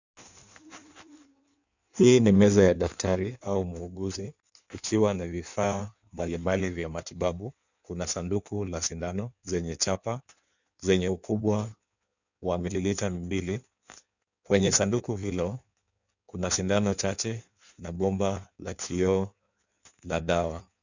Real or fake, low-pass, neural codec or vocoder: fake; 7.2 kHz; codec, 16 kHz in and 24 kHz out, 1.1 kbps, FireRedTTS-2 codec